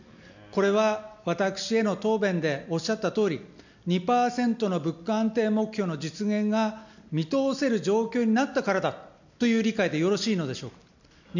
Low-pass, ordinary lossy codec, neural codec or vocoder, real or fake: 7.2 kHz; none; none; real